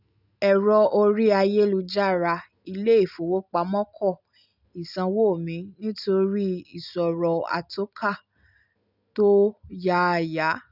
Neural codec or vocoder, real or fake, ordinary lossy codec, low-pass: none; real; none; 5.4 kHz